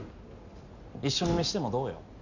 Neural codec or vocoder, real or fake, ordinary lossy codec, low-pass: none; real; none; 7.2 kHz